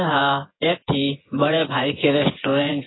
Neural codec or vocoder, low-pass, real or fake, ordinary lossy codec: vocoder, 24 kHz, 100 mel bands, Vocos; 7.2 kHz; fake; AAC, 16 kbps